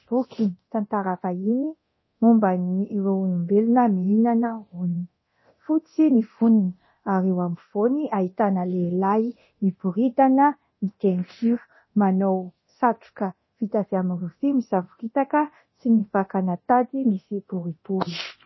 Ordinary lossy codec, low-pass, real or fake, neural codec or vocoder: MP3, 24 kbps; 7.2 kHz; fake; codec, 24 kHz, 0.9 kbps, DualCodec